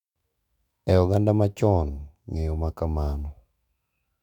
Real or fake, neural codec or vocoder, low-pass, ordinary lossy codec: fake; autoencoder, 48 kHz, 128 numbers a frame, DAC-VAE, trained on Japanese speech; 19.8 kHz; none